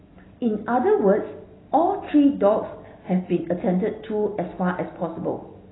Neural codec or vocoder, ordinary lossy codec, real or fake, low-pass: none; AAC, 16 kbps; real; 7.2 kHz